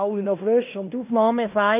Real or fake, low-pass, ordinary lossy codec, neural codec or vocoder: fake; 3.6 kHz; none; codec, 16 kHz in and 24 kHz out, 0.9 kbps, LongCat-Audio-Codec, fine tuned four codebook decoder